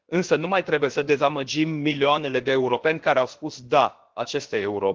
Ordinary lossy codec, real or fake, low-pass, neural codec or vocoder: Opus, 16 kbps; fake; 7.2 kHz; codec, 16 kHz, about 1 kbps, DyCAST, with the encoder's durations